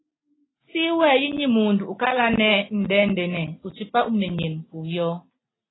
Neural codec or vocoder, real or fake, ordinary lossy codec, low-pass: none; real; AAC, 16 kbps; 7.2 kHz